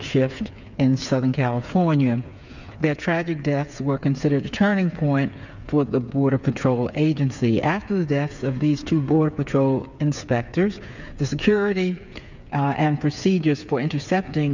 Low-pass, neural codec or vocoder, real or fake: 7.2 kHz; codec, 16 kHz, 8 kbps, FreqCodec, smaller model; fake